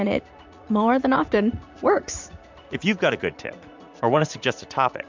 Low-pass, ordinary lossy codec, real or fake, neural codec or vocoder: 7.2 kHz; MP3, 64 kbps; fake; vocoder, 44.1 kHz, 80 mel bands, Vocos